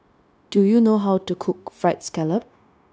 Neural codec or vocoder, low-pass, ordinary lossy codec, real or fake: codec, 16 kHz, 0.9 kbps, LongCat-Audio-Codec; none; none; fake